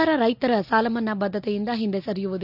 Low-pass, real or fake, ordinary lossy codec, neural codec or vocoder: 5.4 kHz; real; none; none